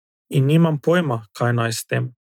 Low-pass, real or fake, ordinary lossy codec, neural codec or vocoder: 19.8 kHz; real; none; none